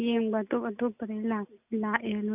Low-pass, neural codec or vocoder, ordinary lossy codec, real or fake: 3.6 kHz; none; none; real